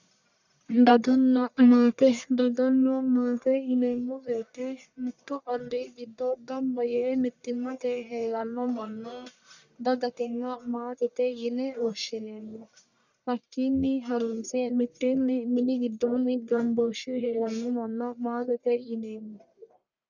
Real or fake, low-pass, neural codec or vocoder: fake; 7.2 kHz; codec, 44.1 kHz, 1.7 kbps, Pupu-Codec